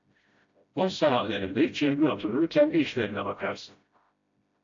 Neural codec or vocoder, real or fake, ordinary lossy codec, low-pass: codec, 16 kHz, 0.5 kbps, FreqCodec, smaller model; fake; AAC, 48 kbps; 7.2 kHz